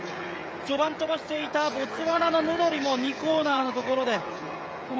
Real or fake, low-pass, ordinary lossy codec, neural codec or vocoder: fake; none; none; codec, 16 kHz, 16 kbps, FreqCodec, smaller model